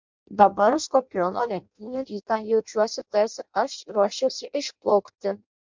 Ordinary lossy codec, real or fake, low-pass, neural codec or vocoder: MP3, 64 kbps; fake; 7.2 kHz; codec, 16 kHz in and 24 kHz out, 0.6 kbps, FireRedTTS-2 codec